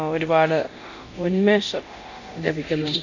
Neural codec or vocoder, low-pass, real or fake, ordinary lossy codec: codec, 24 kHz, 0.9 kbps, DualCodec; 7.2 kHz; fake; none